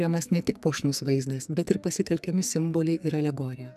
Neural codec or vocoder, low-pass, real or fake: codec, 44.1 kHz, 2.6 kbps, SNAC; 14.4 kHz; fake